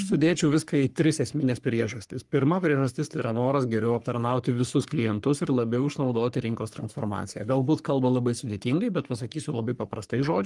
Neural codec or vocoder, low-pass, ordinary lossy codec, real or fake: codec, 44.1 kHz, 3.4 kbps, Pupu-Codec; 10.8 kHz; Opus, 32 kbps; fake